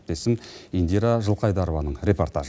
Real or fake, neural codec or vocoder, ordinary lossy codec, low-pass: real; none; none; none